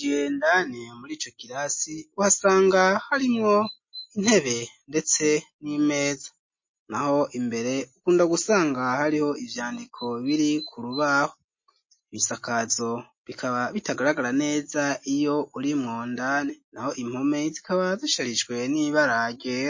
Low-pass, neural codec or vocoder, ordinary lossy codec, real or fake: 7.2 kHz; none; MP3, 32 kbps; real